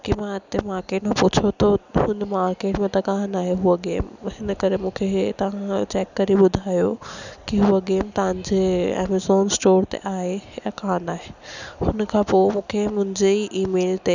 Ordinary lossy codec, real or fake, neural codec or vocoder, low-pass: none; real; none; 7.2 kHz